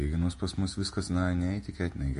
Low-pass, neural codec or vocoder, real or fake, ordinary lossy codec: 14.4 kHz; vocoder, 48 kHz, 128 mel bands, Vocos; fake; MP3, 48 kbps